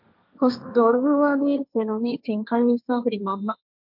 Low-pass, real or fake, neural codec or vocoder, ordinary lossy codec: 5.4 kHz; fake; codec, 16 kHz, 1.1 kbps, Voila-Tokenizer; MP3, 48 kbps